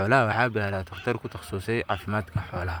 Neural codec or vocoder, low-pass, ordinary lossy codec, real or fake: vocoder, 44.1 kHz, 128 mel bands, Pupu-Vocoder; none; none; fake